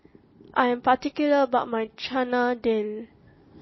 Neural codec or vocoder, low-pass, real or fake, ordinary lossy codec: none; 7.2 kHz; real; MP3, 24 kbps